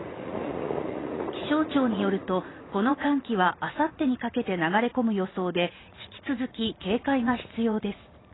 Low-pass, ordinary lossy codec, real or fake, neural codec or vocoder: 7.2 kHz; AAC, 16 kbps; fake; vocoder, 44.1 kHz, 80 mel bands, Vocos